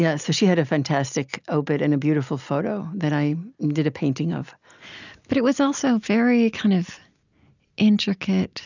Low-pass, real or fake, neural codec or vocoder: 7.2 kHz; real; none